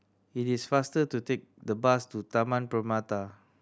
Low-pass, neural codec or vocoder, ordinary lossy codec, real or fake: none; none; none; real